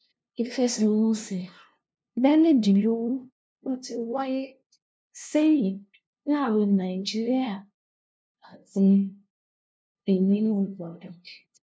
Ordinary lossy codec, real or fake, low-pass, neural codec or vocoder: none; fake; none; codec, 16 kHz, 0.5 kbps, FunCodec, trained on LibriTTS, 25 frames a second